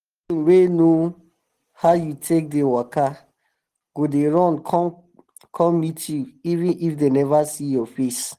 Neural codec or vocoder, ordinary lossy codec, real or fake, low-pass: none; Opus, 16 kbps; real; 14.4 kHz